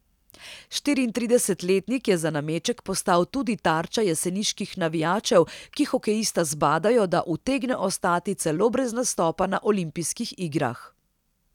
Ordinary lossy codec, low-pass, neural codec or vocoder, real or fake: none; 19.8 kHz; none; real